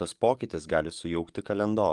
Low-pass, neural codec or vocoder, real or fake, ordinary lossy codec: 10.8 kHz; codec, 44.1 kHz, 7.8 kbps, DAC; fake; Opus, 32 kbps